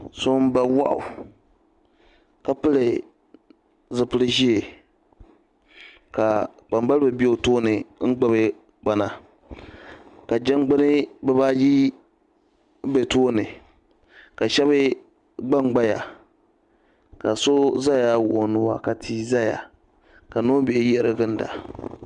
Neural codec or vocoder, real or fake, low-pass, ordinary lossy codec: none; real; 10.8 kHz; Opus, 64 kbps